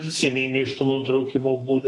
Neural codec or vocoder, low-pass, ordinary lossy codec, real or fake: codec, 32 kHz, 1.9 kbps, SNAC; 10.8 kHz; AAC, 32 kbps; fake